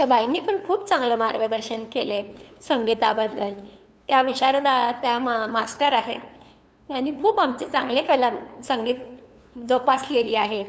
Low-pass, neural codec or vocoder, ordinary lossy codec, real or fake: none; codec, 16 kHz, 2 kbps, FunCodec, trained on LibriTTS, 25 frames a second; none; fake